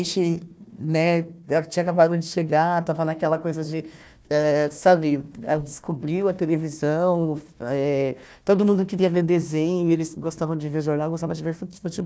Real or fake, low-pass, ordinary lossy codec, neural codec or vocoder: fake; none; none; codec, 16 kHz, 1 kbps, FunCodec, trained on Chinese and English, 50 frames a second